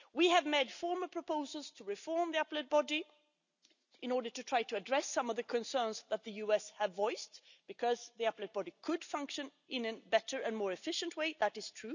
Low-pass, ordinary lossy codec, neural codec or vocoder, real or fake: 7.2 kHz; none; none; real